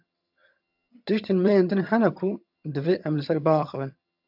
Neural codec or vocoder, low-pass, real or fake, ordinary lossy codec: vocoder, 22.05 kHz, 80 mel bands, HiFi-GAN; 5.4 kHz; fake; AAC, 48 kbps